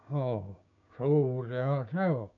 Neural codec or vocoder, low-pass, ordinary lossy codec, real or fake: codec, 16 kHz, 6 kbps, DAC; 7.2 kHz; none; fake